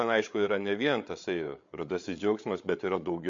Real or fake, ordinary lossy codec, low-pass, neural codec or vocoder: fake; MP3, 48 kbps; 7.2 kHz; codec, 16 kHz, 8 kbps, FreqCodec, larger model